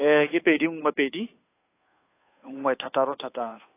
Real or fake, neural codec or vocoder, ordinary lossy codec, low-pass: fake; codec, 16 kHz, 2 kbps, FunCodec, trained on Chinese and English, 25 frames a second; AAC, 24 kbps; 3.6 kHz